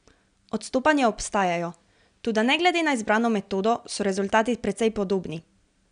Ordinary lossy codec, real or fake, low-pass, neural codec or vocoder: none; real; 9.9 kHz; none